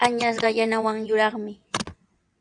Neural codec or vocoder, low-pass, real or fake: vocoder, 22.05 kHz, 80 mel bands, WaveNeXt; 9.9 kHz; fake